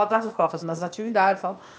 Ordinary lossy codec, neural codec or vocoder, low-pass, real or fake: none; codec, 16 kHz, 0.8 kbps, ZipCodec; none; fake